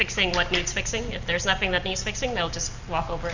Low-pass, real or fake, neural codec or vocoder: 7.2 kHz; real; none